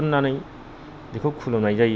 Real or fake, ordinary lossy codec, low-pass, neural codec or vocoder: real; none; none; none